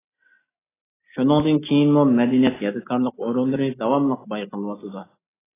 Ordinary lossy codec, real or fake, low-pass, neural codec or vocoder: AAC, 16 kbps; real; 3.6 kHz; none